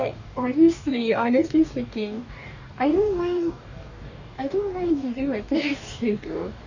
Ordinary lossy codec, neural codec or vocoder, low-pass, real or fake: none; codec, 44.1 kHz, 2.6 kbps, DAC; 7.2 kHz; fake